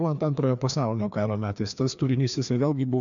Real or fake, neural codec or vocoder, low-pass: fake; codec, 16 kHz, 2 kbps, FreqCodec, larger model; 7.2 kHz